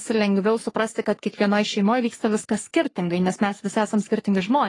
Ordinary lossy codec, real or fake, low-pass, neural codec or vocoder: AAC, 32 kbps; fake; 10.8 kHz; codec, 44.1 kHz, 3.4 kbps, Pupu-Codec